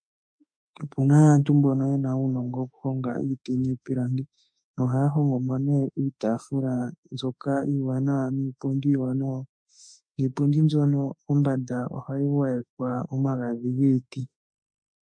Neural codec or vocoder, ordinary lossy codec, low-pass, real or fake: autoencoder, 48 kHz, 32 numbers a frame, DAC-VAE, trained on Japanese speech; MP3, 48 kbps; 9.9 kHz; fake